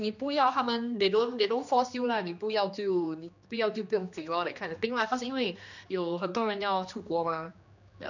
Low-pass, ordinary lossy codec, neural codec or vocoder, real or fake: 7.2 kHz; none; codec, 16 kHz, 2 kbps, X-Codec, HuBERT features, trained on general audio; fake